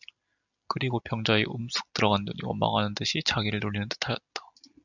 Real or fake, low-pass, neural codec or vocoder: real; 7.2 kHz; none